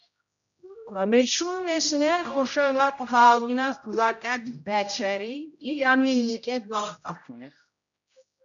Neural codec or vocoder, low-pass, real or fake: codec, 16 kHz, 0.5 kbps, X-Codec, HuBERT features, trained on general audio; 7.2 kHz; fake